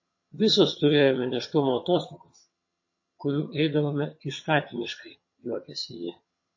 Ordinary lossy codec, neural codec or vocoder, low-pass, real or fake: MP3, 32 kbps; vocoder, 22.05 kHz, 80 mel bands, HiFi-GAN; 7.2 kHz; fake